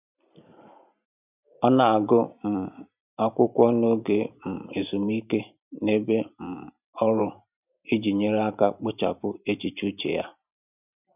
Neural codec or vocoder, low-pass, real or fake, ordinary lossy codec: vocoder, 44.1 kHz, 128 mel bands every 512 samples, BigVGAN v2; 3.6 kHz; fake; none